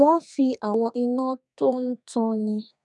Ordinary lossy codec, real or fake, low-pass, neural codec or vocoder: none; fake; 10.8 kHz; codec, 32 kHz, 1.9 kbps, SNAC